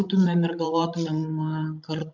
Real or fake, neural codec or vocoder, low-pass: fake; codec, 16 kHz, 16 kbps, FreqCodec, larger model; 7.2 kHz